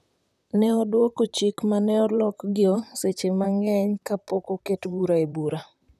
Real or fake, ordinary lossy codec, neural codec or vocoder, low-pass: fake; none; vocoder, 44.1 kHz, 128 mel bands, Pupu-Vocoder; 14.4 kHz